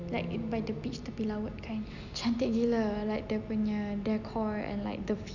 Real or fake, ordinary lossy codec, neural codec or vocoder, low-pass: real; none; none; 7.2 kHz